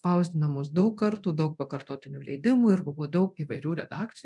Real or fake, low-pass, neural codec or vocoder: fake; 10.8 kHz; codec, 24 kHz, 0.9 kbps, DualCodec